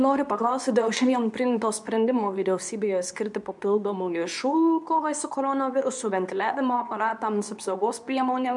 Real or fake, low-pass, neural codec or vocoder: fake; 10.8 kHz; codec, 24 kHz, 0.9 kbps, WavTokenizer, medium speech release version 2